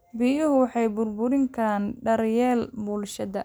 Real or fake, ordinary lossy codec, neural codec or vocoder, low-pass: real; none; none; none